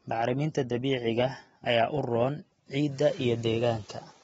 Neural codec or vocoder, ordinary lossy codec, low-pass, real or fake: none; AAC, 24 kbps; 19.8 kHz; real